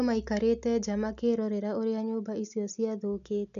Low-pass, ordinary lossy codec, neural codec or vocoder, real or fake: 7.2 kHz; none; none; real